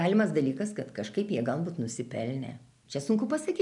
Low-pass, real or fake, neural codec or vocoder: 10.8 kHz; real; none